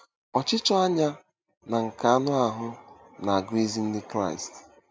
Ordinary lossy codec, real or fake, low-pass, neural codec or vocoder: none; real; none; none